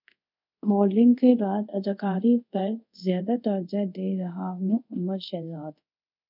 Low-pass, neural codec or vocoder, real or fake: 5.4 kHz; codec, 24 kHz, 0.5 kbps, DualCodec; fake